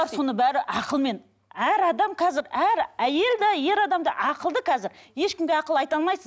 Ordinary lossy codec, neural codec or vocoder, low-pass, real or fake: none; none; none; real